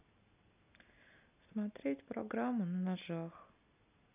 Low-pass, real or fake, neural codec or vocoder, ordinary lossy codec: 3.6 kHz; real; none; none